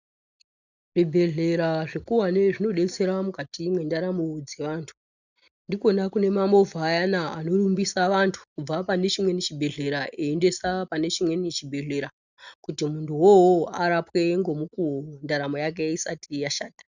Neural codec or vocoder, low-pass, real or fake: none; 7.2 kHz; real